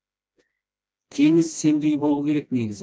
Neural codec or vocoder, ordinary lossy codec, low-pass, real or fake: codec, 16 kHz, 1 kbps, FreqCodec, smaller model; none; none; fake